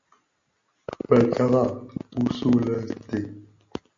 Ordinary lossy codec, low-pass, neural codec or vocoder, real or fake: MP3, 48 kbps; 7.2 kHz; none; real